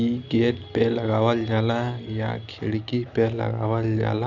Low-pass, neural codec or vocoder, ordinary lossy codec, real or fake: 7.2 kHz; none; none; real